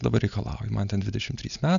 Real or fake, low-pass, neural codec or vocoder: real; 7.2 kHz; none